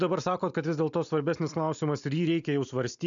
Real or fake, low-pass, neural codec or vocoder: real; 7.2 kHz; none